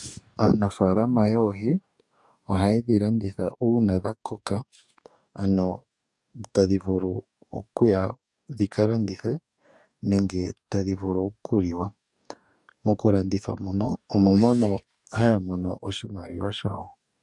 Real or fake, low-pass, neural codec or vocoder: fake; 10.8 kHz; codec, 44.1 kHz, 2.6 kbps, DAC